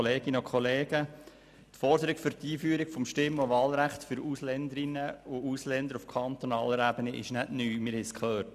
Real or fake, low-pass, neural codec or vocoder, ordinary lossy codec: real; 14.4 kHz; none; none